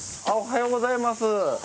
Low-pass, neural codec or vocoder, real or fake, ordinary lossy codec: none; none; real; none